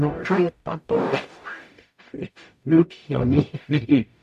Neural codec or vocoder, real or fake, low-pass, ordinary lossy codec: codec, 44.1 kHz, 0.9 kbps, DAC; fake; 14.4 kHz; MP3, 96 kbps